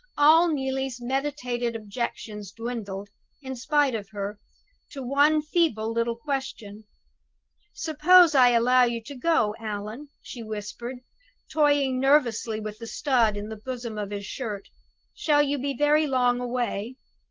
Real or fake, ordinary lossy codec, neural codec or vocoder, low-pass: real; Opus, 16 kbps; none; 7.2 kHz